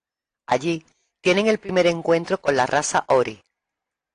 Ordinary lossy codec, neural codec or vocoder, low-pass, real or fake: AAC, 48 kbps; none; 9.9 kHz; real